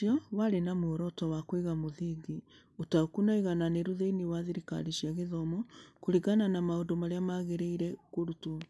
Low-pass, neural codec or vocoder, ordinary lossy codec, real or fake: none; none; none; real